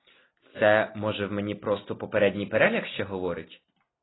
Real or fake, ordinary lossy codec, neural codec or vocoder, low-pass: real; AAC, 16 kbps; none; 7.2 kHz